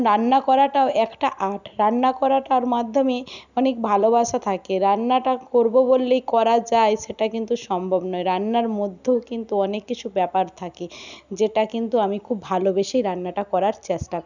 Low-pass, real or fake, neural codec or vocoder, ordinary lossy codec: 7.2 kHz; real; none; none